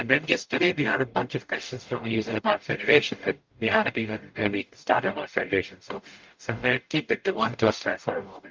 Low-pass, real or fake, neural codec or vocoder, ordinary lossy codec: 7.2 kHz; fake; codec, 44.1 kHz, 0.9 kbps, DAC; Opus, 24 kbps